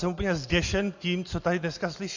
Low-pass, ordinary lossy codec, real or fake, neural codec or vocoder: 7.2 kHz; AAC, 48 kbps; real; none